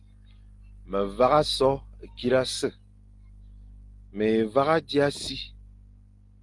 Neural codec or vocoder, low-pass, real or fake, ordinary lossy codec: none; 10.8 kHz; real; Opus, 24 kbps